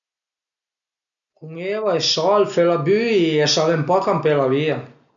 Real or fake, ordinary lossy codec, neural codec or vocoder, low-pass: real; none; none; 7.2 kHz